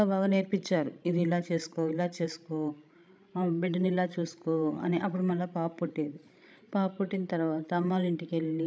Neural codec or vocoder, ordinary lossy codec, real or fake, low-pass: codec, 16 kHz, 16 kbps, FreqCodec, larger model; none; fake; none